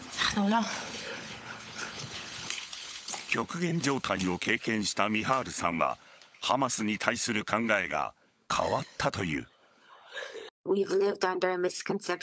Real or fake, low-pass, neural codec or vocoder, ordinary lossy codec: fake; none; codec, 16 kHz, 16 kbps, FunCodec, trained on LibriTTS, 50 frames a second; none